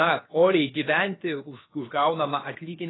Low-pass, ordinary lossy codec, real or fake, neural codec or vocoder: 7.2 kHz; AAC, 16 kbps; fake; codec, 16 kHz, 0.7 kbps, FocalCodec